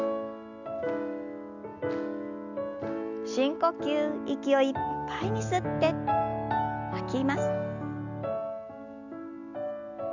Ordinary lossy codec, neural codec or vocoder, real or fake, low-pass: none; none; real; 7.2 kHz